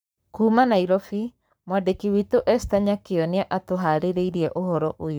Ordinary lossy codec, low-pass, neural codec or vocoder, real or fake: none; none; codec, 44.1 kHz, 7.8 kbps, Pupu-Codec; fake